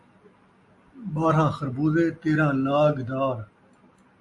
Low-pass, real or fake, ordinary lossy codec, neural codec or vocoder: 10.8 kHz; real; AAC, 64 kbps; none